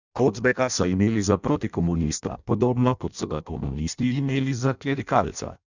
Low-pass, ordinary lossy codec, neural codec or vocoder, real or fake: 7.2 kHz; none; codec, 16 kHz in and 24 kHz out, 0.6 kbps, FireRedTTS-2 codec; fake